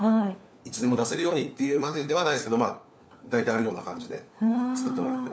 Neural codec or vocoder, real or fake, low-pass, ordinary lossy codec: codec, 16 kHz, 4 kbps, FunCodec, trained on LibriTTS, 50 frames a second; fake; none; none